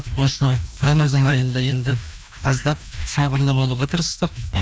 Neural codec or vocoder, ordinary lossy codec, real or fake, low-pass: codec, 16 kHz, 1 kbps, FreqCodec, larger model; none; fake; none